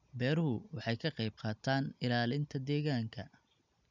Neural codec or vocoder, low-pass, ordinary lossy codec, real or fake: none; 7.2 kHz; none; real